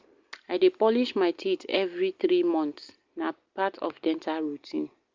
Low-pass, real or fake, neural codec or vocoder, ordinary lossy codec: 7.2 kHz; real; none; Opus, 32 kbps